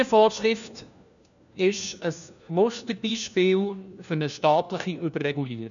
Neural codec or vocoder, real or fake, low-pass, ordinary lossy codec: codec, 16 kHz, 1 kbps, FunCodec, trained on LibriTTS, 50 frames a second; fake; 7.2 kHz; none